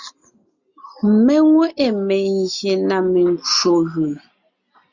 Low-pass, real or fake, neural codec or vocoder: 7.2 kHz; real; none